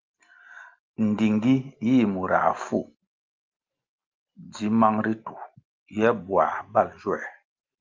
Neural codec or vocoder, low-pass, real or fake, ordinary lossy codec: none; 7.2 kHz; real; Opus, 24 kbps